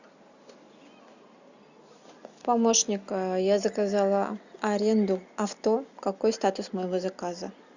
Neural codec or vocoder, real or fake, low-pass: none; real; 7.2 kHz